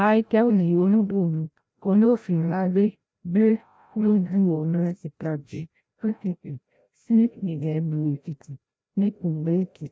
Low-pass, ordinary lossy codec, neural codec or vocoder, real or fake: none; none; codec, 16 kHz, 0.5 kbps, FreqCodec, larger model; fake